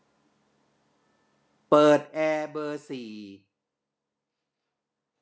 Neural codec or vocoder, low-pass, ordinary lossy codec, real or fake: none; none; none; real